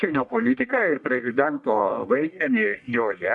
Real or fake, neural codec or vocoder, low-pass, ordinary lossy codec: fake; codec, 44.1 kHz, 1.7 kbps, Pupu-Codec; 10.8 kHz; MP3, 96 kbps